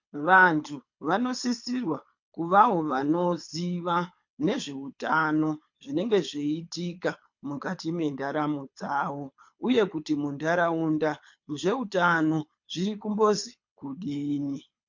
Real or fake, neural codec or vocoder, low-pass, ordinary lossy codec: fake; codec, 24 kHz, 6 kbps, HILCodec; 7.2 kHz; MP3, 48 kbps